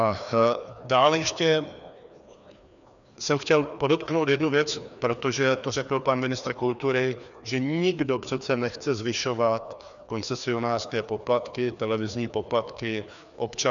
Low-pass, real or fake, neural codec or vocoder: 7.2 kHz; fake; codec, 16 kHz, 2 kbps, FreqCodec, larger model